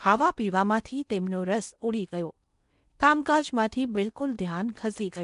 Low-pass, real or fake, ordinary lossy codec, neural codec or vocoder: 10.8 kHz; fake; none; codec, 16 kHz in and 24 kHz out, 0.8 kbps, FocalCodec, streaming, 65536 codes